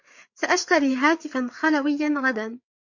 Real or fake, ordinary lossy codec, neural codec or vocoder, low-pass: fake; MP3, 48 kbps; codec, 16 kHz, 4 kbps, FreqCodec, larger model; 7.2 kHz